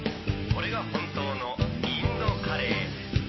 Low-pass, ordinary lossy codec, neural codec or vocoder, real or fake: 7.2 kHz; MP3, 24 kbps; none; real